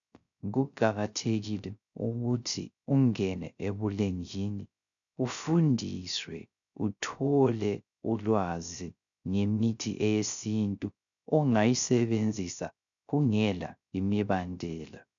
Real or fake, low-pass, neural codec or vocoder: fake; 7.2 kHz; codec, 16 kHz, 0.3 kbps, FocalCodec